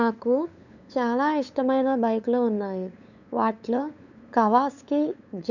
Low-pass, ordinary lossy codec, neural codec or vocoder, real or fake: 7.2 kHz; none; codec, 16 kHz, 8 kbps, FunCodec, trained on LibriTTS, 25 frames a second; fake